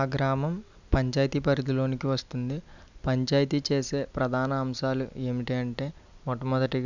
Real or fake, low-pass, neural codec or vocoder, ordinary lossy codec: real; 7.2 kHz; none; none